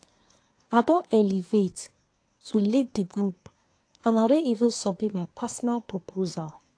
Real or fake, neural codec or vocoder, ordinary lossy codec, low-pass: fake; codec, 24 kHz, 1 kbps, SNAC; AAC, 48 kbps; 9.9 kHz